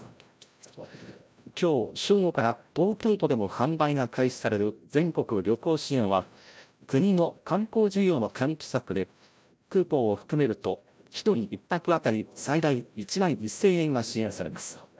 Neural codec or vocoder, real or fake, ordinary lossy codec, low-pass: codec, 16 kHz, 0.5 kbps, FreqCodec, larger model; fake; none; none